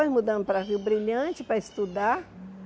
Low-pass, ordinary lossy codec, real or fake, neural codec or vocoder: none; none; real; none